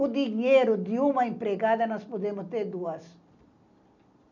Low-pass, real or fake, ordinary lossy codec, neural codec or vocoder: 7.2 kHz; real; none; none